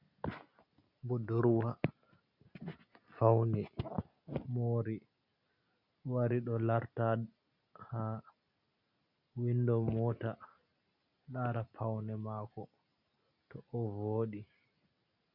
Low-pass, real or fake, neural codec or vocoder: 5.4 kHz; real; none